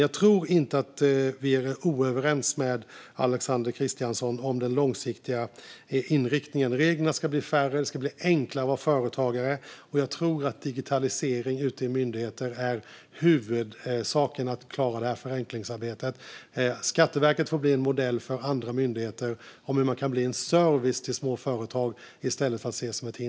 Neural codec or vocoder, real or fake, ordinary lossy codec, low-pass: none; real; none; none